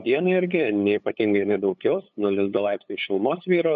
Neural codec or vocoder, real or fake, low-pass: codec, 16 kHz, 8 kbps, FunCodec, trained on LibriTTS, 25 frames a second; fake; 7.2 kHz